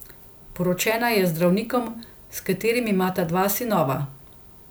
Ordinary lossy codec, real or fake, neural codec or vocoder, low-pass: none; real; none; none